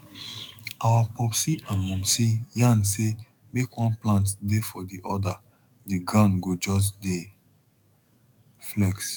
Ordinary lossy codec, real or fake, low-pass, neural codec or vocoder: none; fake; 19.8 kHz; codec, 44.1 kHz, 7.8 kbps, DAC